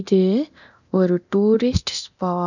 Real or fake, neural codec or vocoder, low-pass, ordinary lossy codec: fake; codec, 24 kHz, 0.9 kbps, DualCodec; 7.2 kHz; none